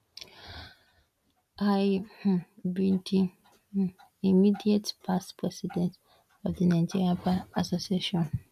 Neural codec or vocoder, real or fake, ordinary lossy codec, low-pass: none; real; none; 14.4 kHz